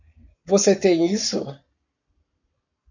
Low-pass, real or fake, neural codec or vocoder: 7.2 kHz; fake; codec, 44.1 kHz, 7.8 kbps, Pupu-Codec